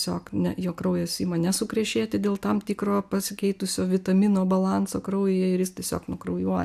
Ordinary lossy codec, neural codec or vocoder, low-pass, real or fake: AAC, 96 kbps; none; 14.4 kHz; real